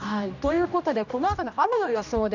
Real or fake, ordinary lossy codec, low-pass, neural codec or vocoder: fake; none; 7.2 kHz; codec, 16 kHz, 1 kbps, X-Codec, HuBERT features, trained on general audio